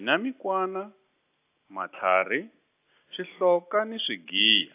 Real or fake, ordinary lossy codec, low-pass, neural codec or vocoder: real; AAC, 24 kbps; 3.6 kHz; none